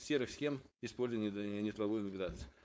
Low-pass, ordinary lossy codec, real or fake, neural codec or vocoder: none; none; fake; codec, 16 kHz, 4.8 kbps, FACodec